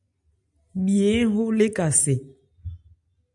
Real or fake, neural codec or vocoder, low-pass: real; none; 10.8 kHz